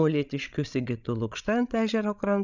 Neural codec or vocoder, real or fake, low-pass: codec, 16 kHz, 16 kbps, FreqCodec, larger model; fake; 7.2 kHz